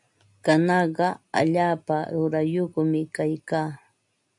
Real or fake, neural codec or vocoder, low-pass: real; none; 10.8 kHz